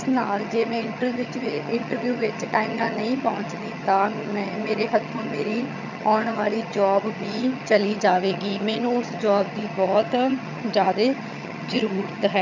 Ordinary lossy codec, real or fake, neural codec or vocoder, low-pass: none; fake; vocoder, 22.05 kHz, 80 mel bands, HiFi-GAN; 7.2 kHz